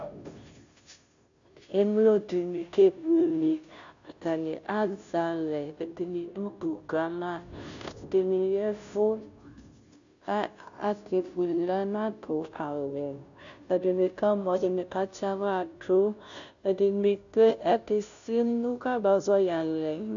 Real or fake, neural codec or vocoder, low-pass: fake; codec, 16 kHz, 0.5 kbps, FunCodec, trained on Chinese and English, 25 frames a second; 7.2 kHz